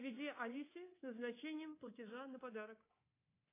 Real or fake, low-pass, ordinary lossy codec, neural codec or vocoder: fake; 3.6 kHz; AAC, 24 kbps; codec, 24 kHz, 3.1 kbps, DualCodec